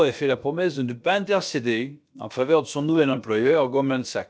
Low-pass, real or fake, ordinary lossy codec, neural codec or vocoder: none; fake; none; codec, 16 kHz, about 1 kbps, DyCAST, with the encoder's durations